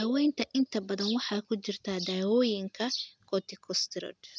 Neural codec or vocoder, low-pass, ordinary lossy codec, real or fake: none; none; none; real